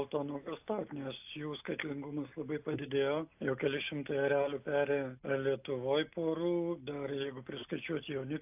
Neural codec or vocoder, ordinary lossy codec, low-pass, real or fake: none; AAC, 32 kbps; 3.6 kHz; real